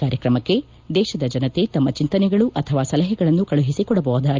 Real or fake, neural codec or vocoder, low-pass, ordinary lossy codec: real; none; 7.2 kHz; Opus, 24 kbps